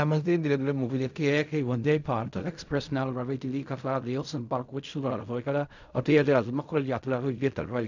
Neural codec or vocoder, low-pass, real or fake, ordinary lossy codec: codec, 16 kHz in and 24 kHz out, 0.4 kbps, LongCat-Audio-Codec, fine tuned four codebook decoder; 7.2 kHz; fake; none